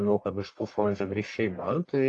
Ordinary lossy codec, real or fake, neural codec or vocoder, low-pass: AAC, 48 kbps; fake; codec, 44.1 kHz, 1.7 kbps, Pupu-Codec; 10.8 kHz